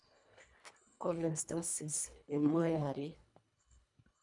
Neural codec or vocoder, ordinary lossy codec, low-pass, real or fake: codec, 24 kHz, 1.5 kbps, HILCodec; none; 10.8 kHz; fake